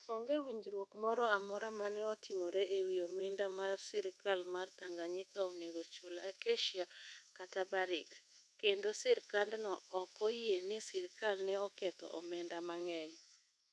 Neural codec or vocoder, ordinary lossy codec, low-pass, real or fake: codec, 24 kHz, 1.2 kbps, DualCodec; none; none; fake